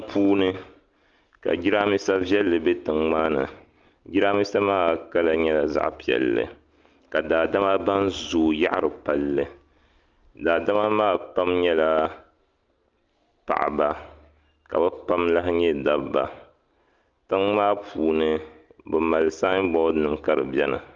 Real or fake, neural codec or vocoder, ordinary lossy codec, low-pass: real; none; Opus, 24 kbps; 7.2 kHz